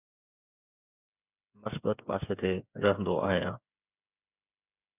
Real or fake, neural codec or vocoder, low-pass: fake; codec, 16 kHz, 16 kbps, FreqCodec, smaller model; 3.6 kHz